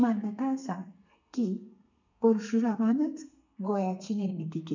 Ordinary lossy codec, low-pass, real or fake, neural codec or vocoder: none; 7.2 kHz; fake; codec, 32 kHz, 1.9 kbps, SNAC